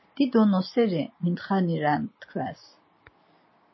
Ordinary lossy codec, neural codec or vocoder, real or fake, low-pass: MP3, 24 kbps; none; real; 7.2 kHz